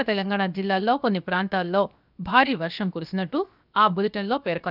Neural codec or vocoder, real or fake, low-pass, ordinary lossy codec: codec, 16 kHz, 0.7 kbps, FocalCodec; fake; 5.4 kHz; none